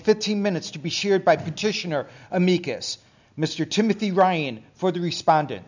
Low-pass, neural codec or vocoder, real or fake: 7.2 kHz; none; real